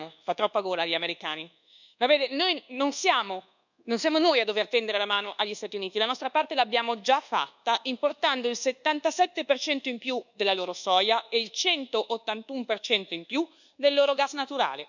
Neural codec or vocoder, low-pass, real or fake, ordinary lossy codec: codec, 24 kHz, 1.2 kbps, DualCodec; 7.2 kHz; fake; none